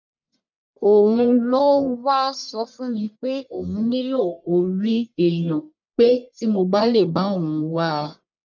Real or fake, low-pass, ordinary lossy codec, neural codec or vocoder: fake; 7.2 kHz; none; codec, 44.1 kHz, 1.7 kbps, Pupu-Codec